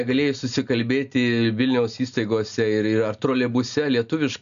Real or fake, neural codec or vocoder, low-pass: real; none; 7.2 kHz